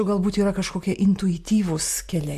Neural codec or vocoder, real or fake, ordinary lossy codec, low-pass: none; real; MP3, 64 kbps; 14.4 kHz